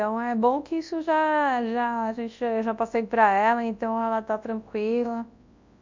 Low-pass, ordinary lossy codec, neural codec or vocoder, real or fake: 7.2 kHz; MP3, 64 kbps; codec, 24 kHz, 0.9 kbps, WavTokenizer, large speech release; fake